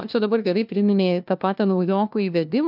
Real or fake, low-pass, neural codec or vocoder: fake; 5.4 kHz; codec, 16 kHz, 1 kbps, FunCodec, trained on LibriTTS, 50 frames a second